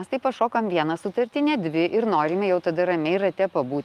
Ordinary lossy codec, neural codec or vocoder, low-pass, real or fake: Opus, 32 kbps; none; 14.4 kHz; real